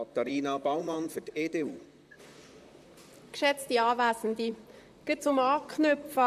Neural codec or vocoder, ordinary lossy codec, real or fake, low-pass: vocoder, 44.1 kHz, 128 mel bands, Pupu-Vocoder; none; fake; 14.4 kHz